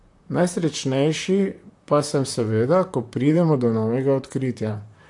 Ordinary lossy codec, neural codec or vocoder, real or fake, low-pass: AAC, 64 kbps; vocoder, 44.1 kHz, 128 mel bands, Pupu-Vocoder; fake; 10.8 kHz